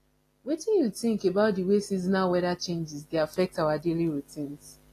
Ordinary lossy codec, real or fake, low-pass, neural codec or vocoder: AAC, 48 kbps; real; 14.4 kHz; none